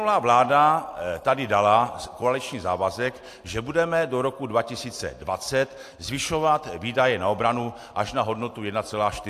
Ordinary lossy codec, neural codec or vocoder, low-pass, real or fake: AAC, 64 kbps; none; 14.4 kHz; real